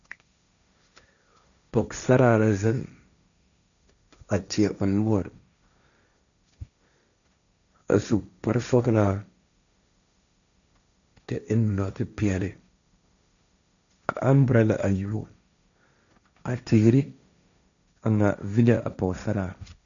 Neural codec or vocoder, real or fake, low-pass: codec, 16 kHz, 1.1 kbps, Voila-Tokenizer; fake; 7.2 kHz